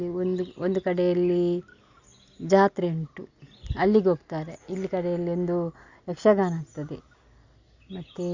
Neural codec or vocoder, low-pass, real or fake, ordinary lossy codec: none; 7.2 kHz; real; Opus, 64 kbps